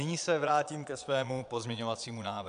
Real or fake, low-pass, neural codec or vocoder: fake; 9.9 kHz; vocoder, 22.05 kHz, 80 mel bands, Vocos